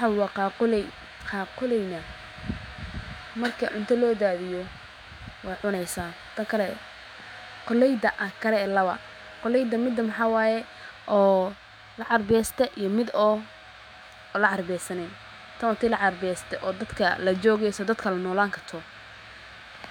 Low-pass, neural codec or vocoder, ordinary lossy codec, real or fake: 19.8 kHz; autoencoder, 48 kHz, 128 numbers a frame, DAC-VAE, trained on Japanese speech; none; fake